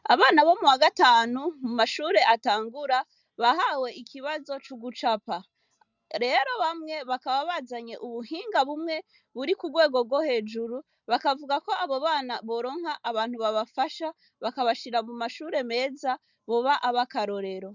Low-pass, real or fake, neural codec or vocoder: 7.2 kHz; real; none